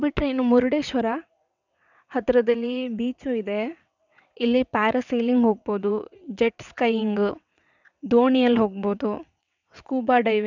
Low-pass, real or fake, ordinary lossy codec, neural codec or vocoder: 7.2 kHz; fake; none; vocoder, 22.05 kHz, 80 mel bands, WaveNeXt